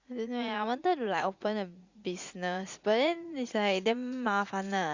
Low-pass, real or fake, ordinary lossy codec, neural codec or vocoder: 7.2 kHz; fake; Opus, 64 kbps; vocoder, 44.1 kHz, 80 mel bands, Vocos